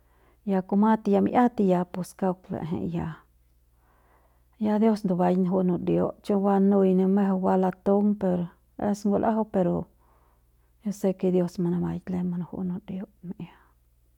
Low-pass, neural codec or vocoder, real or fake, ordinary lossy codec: 19.8 kHz; none; real; none